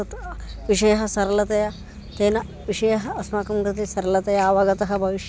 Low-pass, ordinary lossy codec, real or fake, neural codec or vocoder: none; none; real; none